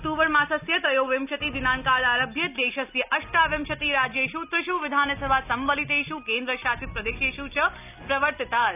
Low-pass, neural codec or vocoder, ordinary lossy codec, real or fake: 3.6 kHz; none; none; real